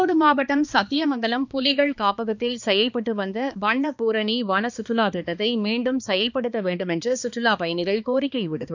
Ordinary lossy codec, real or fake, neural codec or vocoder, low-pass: none; fake; codec, 16 kHz, 2 kbps, X-Codec, HuBERT features, trained on balanced general audio; 7.2 kHz